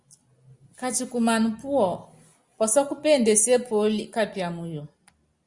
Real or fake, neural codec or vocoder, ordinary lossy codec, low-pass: real; none; Opus, 64 kbps; 10.8 kHz